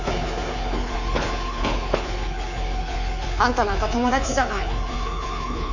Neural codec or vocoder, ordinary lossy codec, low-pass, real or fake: codec, 24 kHz, 3.1 kbps, DualCodec; none; 7.2 kHz; fake